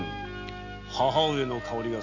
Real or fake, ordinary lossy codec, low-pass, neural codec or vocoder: real; none; 7.2 kHz; none